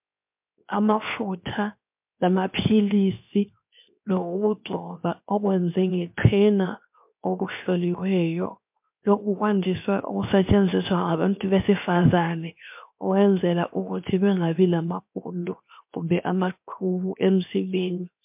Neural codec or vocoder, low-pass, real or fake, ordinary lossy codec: codec, 16 kHz, 0.7 kbps, FocalCodec; 3.6 kHz; fake; MP3, 32 kbps